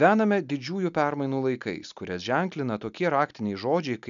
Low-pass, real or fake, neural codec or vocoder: 7.2 kHz; real; none